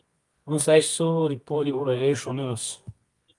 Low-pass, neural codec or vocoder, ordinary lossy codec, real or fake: 10.8 kHz; codec, 24 kHz, 0.9 kbps, WavTokenizer, medium music audio release; Opus, 32 kbps; fake